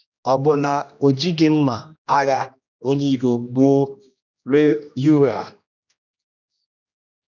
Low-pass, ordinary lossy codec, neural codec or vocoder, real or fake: 7.2 kHz; none; codec, 16 kHz, 1 kbps, X-Codec, HuBERT features, trained on general audio; fake